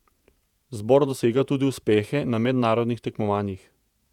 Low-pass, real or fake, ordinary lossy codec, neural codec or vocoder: 19.8 kHz; fake; none; vocoder, 48 kHz, 128 mel bands, Vocos